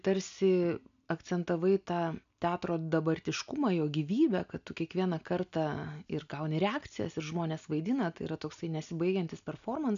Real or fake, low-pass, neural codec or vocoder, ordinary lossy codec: real; 7.2 kHz; none; MP3, 96 kbps